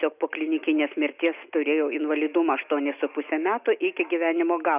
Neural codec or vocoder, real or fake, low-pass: none; real; 3.6 kHz